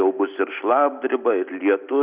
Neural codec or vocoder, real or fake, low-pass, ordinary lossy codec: none; real; 3.6 kHz; Opus, 64 kbps